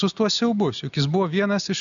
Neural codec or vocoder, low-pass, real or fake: none; 7.2 kHz; real